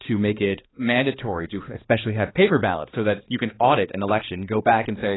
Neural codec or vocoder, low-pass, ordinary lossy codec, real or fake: codec, 16 kHz, 2 kbps, X-Codec, HuBERT features, trained on balanced general audio; 7.2 kHz; AAC, 16 kbps; fake